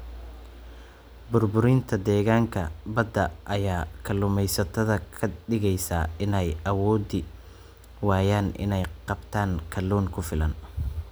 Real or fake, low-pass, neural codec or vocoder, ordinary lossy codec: real; none; none; none